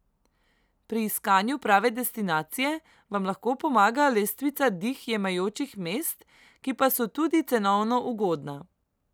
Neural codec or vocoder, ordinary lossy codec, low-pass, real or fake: none; none; none; real